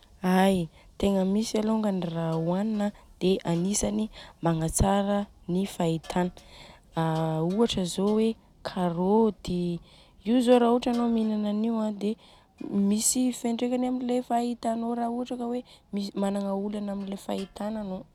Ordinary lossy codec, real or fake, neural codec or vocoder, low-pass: none; real; none; 19.8 kHz